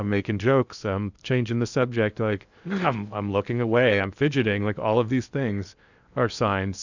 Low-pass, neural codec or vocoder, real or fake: 7.2 kHz; codec, 16 kHz in and 24 kHz out, 0.8 kbps, FocalCodec, streaming, 65536 codes; fake